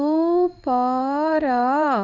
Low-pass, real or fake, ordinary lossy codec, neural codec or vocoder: 7.2 kHz; fake; none; codec, 16 kHz in and 24 kHz out, 1 kbps, XY-Tokenizer